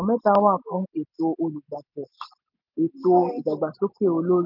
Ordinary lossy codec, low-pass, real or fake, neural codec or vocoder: none; 5.4 kHz; real; none